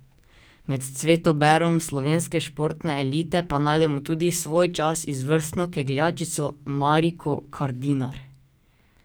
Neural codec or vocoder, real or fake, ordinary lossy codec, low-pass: codec, 44.1 kHz, 2.6 kbps, SNAC; fake; none; none